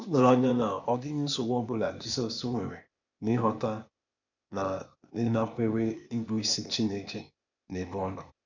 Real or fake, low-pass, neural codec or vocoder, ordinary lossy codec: fake; 7.2 kHz; codec, 16 kHz, 0.8 kbps, ZipCodec; none